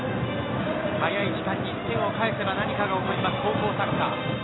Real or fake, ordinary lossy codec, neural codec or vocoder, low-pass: real; AAC, 16 kbps; none; 7.2 kHz